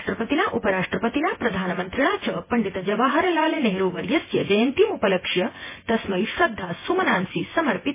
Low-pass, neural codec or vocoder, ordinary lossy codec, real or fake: 3.6 kHz; vocoder, 24 kHz, 100 mel bands, Vocos; MP3, 16 kbps; fake